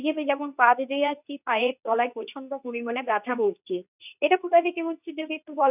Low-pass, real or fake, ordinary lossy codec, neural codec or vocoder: 3.6 kHz; fake; none; codec, 24 kHz, 0.9 kbps, WavTokenizer, medium speech release version 2